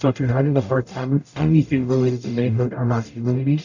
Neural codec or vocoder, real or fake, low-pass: codec, 44.1 kHz, 0.9 kbps, DAC; fake; 7.2 kHz